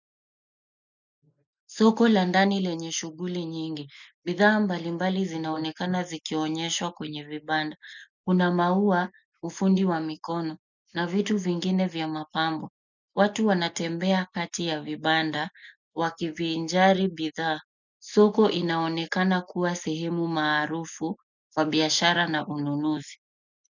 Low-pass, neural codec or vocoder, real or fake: 7.2 kHz; none; real